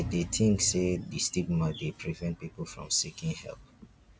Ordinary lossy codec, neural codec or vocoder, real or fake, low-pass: none; none; real; none